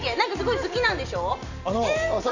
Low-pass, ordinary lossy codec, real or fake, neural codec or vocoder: 7.2 kHz; none; real; none